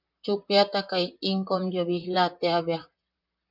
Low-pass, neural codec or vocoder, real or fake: 5.4 kHz; vocoder, 44.1 kHz, 128 mel bands, Pupu-Vocoder; fake